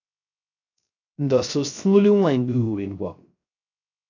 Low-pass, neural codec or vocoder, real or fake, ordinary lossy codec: 7.2 kHz; codec, 16 kHz, 0.3 kbps, FocalCodec; fake; AAC, 48 kbps